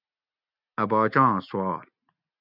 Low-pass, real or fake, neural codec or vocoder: 5.4 kHz; real; none